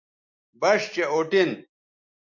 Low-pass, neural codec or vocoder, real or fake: 7.2 kHz; none; real